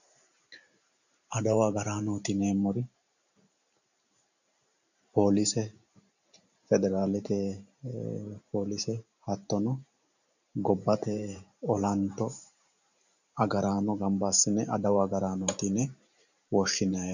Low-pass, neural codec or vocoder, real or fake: 7.2 kHz; vocoder, 44.1 kHz, 128 mel bands every 512 samples, BigVGAN v2; fake